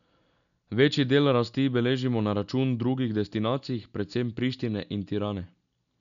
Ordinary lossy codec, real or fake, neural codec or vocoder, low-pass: none; real; none; 7.2 kHz